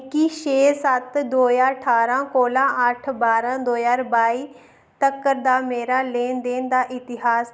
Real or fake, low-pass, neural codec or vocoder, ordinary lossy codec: real; none; none; none